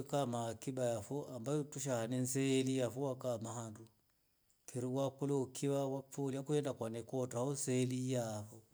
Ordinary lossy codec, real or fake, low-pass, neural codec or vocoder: none; real; none; none